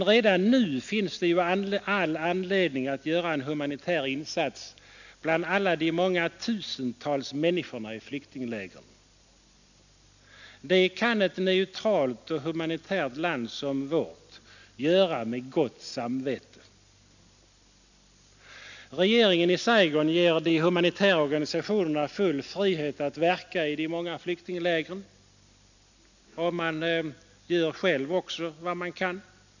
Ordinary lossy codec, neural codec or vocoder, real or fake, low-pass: none; none; real; 7.2 kHz